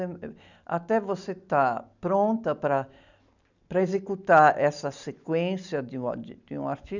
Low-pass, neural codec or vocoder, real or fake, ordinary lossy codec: 7.2 kHz; none; real; none